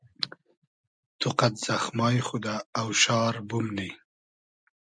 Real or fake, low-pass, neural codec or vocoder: real; 9.9 kHz; none